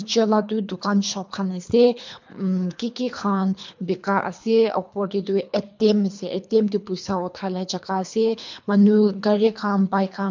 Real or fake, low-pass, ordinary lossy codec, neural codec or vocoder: fake; 7.2 kHz; MP3, 64 kbps; codec, 24 kHz, 3 kbps, HILCodec